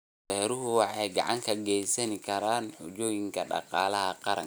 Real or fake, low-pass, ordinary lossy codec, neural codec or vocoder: real; none; none; none